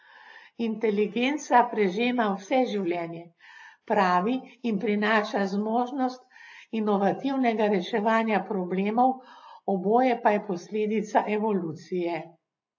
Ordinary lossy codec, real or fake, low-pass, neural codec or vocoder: AAC, 48 kbps; real; 7.2 kHz; none